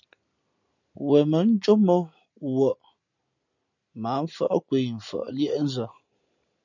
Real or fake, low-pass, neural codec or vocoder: real; 7.2 kHz; none